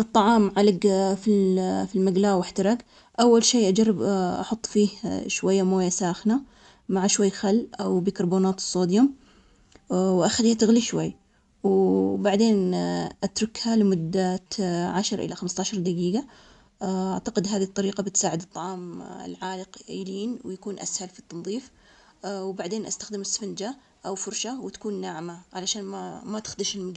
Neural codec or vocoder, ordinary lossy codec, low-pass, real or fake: none; none; 10.8 kHz; real